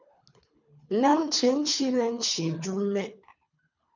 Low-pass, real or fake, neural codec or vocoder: 7.2 kHz; fake; codec, 24 kHz, 3 kbps, HILCodec